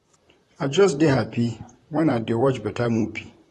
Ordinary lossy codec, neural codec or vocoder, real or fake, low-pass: AAC, 32 kbps; vocoder, 44.1 kHz, 128 mel bands, Pupu-Vocoder; fake; 19.8 kHz